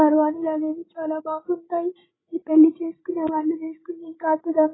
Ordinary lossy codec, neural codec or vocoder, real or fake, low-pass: AAC, 16 kbps; codec, 16 kHz, 16 kbps, FreqCodec, larger model; fake; 7.2 kHz